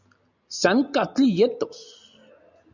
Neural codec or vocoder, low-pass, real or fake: none; 7.2 kHz; real